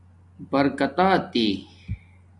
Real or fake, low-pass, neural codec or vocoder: real; 10.8 kHz; none